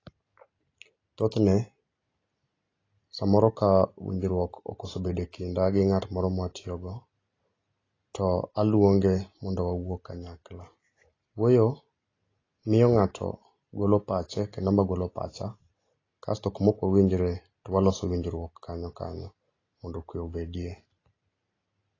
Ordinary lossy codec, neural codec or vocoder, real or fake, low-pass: AAC, 32 kbps; none; real; 7.2 kHz